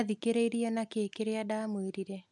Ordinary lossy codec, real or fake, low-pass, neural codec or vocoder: none; real; 10.8 kHz; none